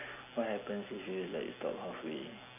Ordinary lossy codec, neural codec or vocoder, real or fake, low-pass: none; none; real; 3.6 kHz